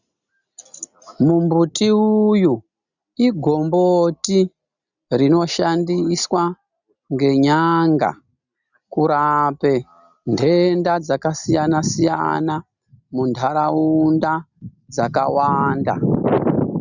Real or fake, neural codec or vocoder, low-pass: real; none; 7.2 kHz